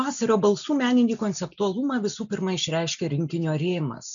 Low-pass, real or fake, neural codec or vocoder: 7.2 kHz; real; none